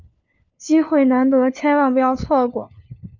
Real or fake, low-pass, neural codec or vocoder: fake; 7.2 kHz; codec, 16 kHz, 4 kbps, FunCodec, trained on LibriTTS, 50 frames a second